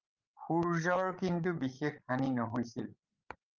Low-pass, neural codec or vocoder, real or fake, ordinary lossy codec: 7.2 kHz; codec, 16 kHz, 16 kbps, FreqCodec, larger model; fake; Opus, 24 kbps